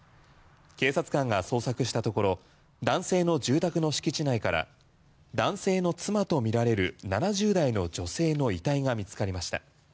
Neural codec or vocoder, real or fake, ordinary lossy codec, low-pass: none; real; none; none